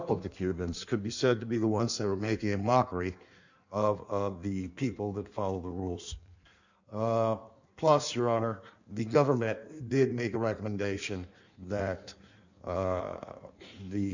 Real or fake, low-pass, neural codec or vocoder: fake; 7.2 kHz; codec, 16 kHz in and 24 kHz out, 1.1 kbps, FireRedTTS-2 codec